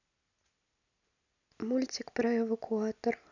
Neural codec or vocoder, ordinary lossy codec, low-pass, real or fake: none; none; 7.2 kHz; real